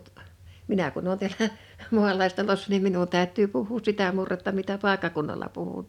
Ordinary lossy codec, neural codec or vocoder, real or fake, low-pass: none; none; real; 19.8 kHz